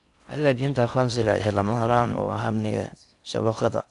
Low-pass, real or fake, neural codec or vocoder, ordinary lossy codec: 10.8 kHz; fake; codec, 16 kHz in and 24 kHz out, 0.6 kbps, FocalCodec, streaming, 4096 codes; none